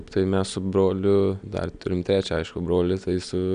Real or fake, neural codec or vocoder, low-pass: real; none; 9.9 kHz